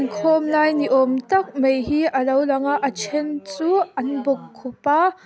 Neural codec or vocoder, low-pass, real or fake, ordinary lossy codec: none; none; real; none